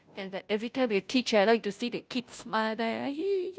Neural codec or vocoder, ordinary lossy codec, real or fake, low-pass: codec, 16 kHz, 0.5 kbps, FunCodec, trained on Chinese and English, 25 frames a second; none; fake; none